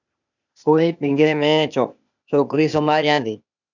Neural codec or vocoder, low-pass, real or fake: codec, 16 kHz, 0.8 kbps, ZipCodec; 7.2 kHz; fake